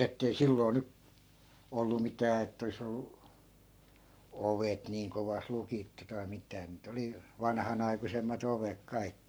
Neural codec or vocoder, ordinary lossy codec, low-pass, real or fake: codec, 44.1 kHz, 7.8 kbps, Pupu-Codec; none; none; fake